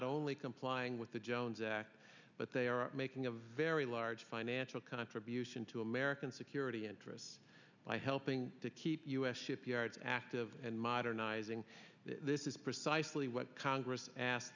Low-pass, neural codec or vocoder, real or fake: 7.2 kHz; none; real